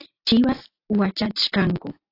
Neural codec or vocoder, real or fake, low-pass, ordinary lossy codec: none; real; 5.4 kHz; AAC, 32 kbps